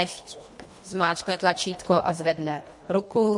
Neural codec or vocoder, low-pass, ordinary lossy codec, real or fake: codec, 24 kHz, 1.5 kbps, HILCodec; 10.8 kHz; MP3, 48 kbps; fake